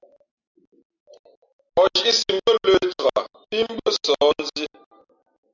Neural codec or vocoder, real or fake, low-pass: none; real; 7.2 kHz